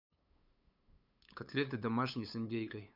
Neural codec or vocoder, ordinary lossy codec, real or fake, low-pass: codec, 16 kHz, 8 kbps, FunCodec, trained on LibriTTS, 25 frames a second; none; fake; 5.4 kHz